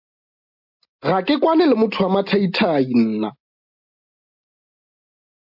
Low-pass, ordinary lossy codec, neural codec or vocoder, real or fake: 5.4 kHz; MP3, 48 kbps; none; real